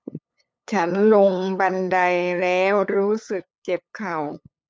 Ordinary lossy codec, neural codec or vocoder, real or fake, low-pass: none; codec, 16 kHz, 8 kbps, FunCodec, trained on LibriTTS, 25 frames a second; fake; none